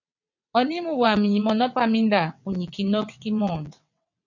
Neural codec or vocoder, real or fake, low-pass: vocoder, 22.05 kHz, 80 mel bands, WaveNeXt; fake; 7.2 kHz